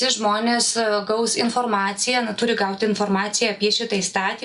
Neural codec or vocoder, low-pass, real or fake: none; 10.8 kHz; real